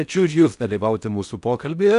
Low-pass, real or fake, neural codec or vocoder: 10.8 kHz; fake; codec, 16 kHz in and 24 kHz out, 0.6 kbps, FocalCodec, streaming, 4096 codes